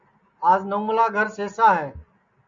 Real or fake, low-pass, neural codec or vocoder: real; 7.2 kHz; none